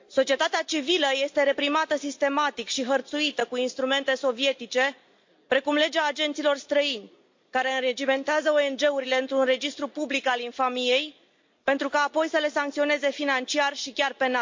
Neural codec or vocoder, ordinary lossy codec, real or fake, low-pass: none; MP3, 48 kbps; real; 7.2 kHz